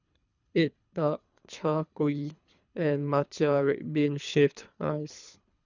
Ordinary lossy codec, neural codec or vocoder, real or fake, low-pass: none; codec, 24 kHz, 3 kbps, HILCodec; fake; 7.2 kHz